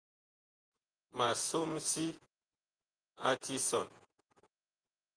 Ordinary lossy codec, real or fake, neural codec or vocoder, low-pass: Opus, 24 kbps; fake; vocoder, 48 kHz, 128 mel bands, Vocos; 9.9 kHz